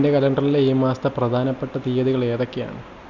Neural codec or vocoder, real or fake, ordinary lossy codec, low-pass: none; real; none; 7.2 kHz